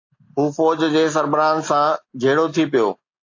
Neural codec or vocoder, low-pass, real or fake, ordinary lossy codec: none; 7.2 kHz; real; AAC, 48 kbps